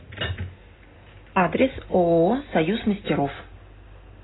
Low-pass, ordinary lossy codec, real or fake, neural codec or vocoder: 7.2 kHz; AAC, 16 kbps; real; none